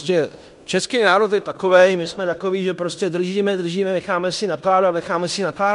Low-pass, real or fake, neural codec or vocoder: 10.8 kHz; fake; codec, 16 kHz in and 24 kHz out, 0.9 kbps, LongCat-Audio-Codec, fine tuned four codebook decoder